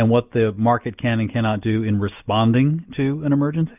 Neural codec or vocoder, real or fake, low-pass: none; real; 3.6 kHz